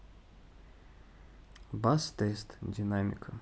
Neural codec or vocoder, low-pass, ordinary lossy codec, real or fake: none; none; none; real